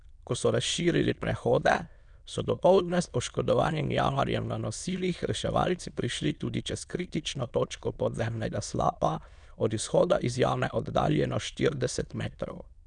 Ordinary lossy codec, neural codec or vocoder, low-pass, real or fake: none; autoencoder, 22.05 kHz, a latent of 192 numbers a frame, VITS, trained on many speakers; 9.9 kHz; fake